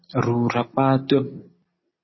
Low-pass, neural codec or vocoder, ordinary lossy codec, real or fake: 7.2 kHz; none; MP3, 24 kbps; real